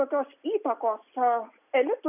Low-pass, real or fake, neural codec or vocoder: 3.6 kHz; real; none